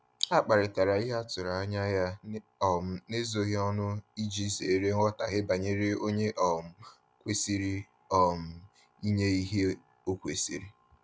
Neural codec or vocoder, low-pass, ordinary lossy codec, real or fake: none; none; none; real